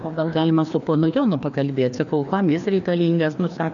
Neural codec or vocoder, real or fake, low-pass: codec, 16 kHz, 2 kbps, FreqCodec, larger model; fake; 7.2 kHz